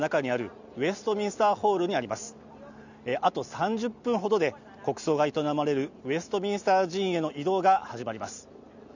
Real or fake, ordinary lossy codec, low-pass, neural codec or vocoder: real; none; 7.2 kHz; none